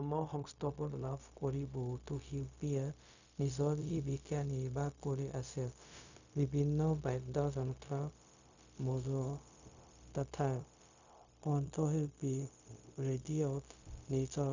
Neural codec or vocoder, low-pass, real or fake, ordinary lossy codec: codec, 16 kHz, 0.4 kbps, LongCat-Audio-Codec; 7.2 kHz; fake; none